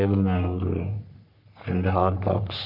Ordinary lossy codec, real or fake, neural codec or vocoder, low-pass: none; fake; codec, 44.1 kHz, 3.4 kbps, Pupu-Codec; 5.4 kHz